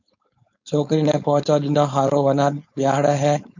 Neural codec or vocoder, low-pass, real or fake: codec, 16 kHz, 4.8 kbps, FACodec; 7.2 kHz; fake